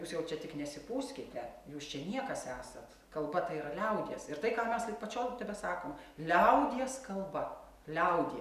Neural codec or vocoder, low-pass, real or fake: none; 14.4 kHz; real